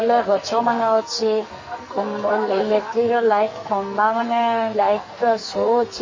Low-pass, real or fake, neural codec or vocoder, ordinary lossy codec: 7.2 kHz; fake; codec, 44.1 kHz, 2.6 kbps, SNAC; MP3, 32 kbps